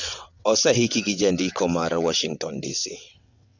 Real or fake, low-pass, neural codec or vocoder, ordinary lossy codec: fake; 7.2 kHz; vocoder, 22.05 kHz, 80 mel bands, WaveNeXt; none